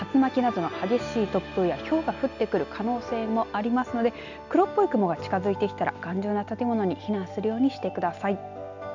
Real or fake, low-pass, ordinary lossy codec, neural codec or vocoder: real; 7.2 kHz; none; none